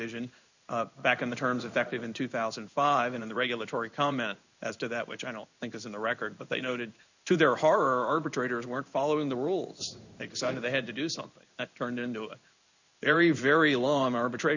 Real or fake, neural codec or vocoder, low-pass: fake; codec, 16 kHz in and 24 kHz out, 1 kbps, XY-Tokenizer; 7.2 kHz